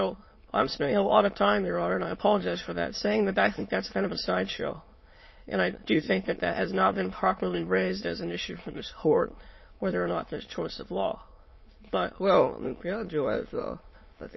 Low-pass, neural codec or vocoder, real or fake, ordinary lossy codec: 7.2 kHz; autoencoder, 22.05 kHz, a latent of 192 numbers a frame, VITS, trained on many speakers; fake; MP3, 24 kbps